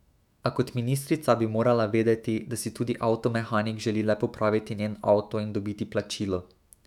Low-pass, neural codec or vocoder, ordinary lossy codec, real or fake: 19.8 kHz; autoencoder, 48 kHz, 128 numbers a frame, DAC-VAE, trained on Japanese speech; none; fake